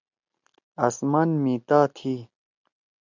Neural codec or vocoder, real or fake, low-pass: none; real; 7.2 kHz